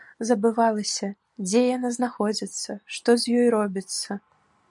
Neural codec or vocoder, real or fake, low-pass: none; real; 10.8 kHz